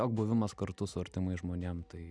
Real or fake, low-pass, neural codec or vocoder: real; 9.9 kHz; none